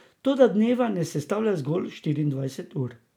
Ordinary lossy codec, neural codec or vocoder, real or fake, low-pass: none; none; real; 19.8 kHz